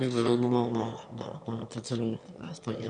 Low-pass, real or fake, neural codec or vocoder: 9.9 kHz; fake; autoencoder, 22.05 kHz, a latent of 192 numbers a frame, VITS, trained on one speaker